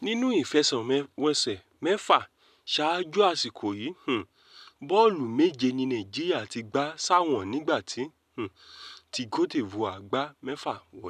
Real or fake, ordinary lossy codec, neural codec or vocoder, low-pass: real; none; none; 14.4 kHz